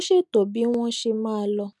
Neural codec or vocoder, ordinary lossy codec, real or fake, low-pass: none; none; real; none